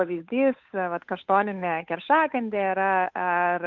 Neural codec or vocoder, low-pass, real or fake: codec, 16 kHz, 8 kbps, FunCodec, trained on Chinese and English, 25 frames a second; 7.2 kHz; fake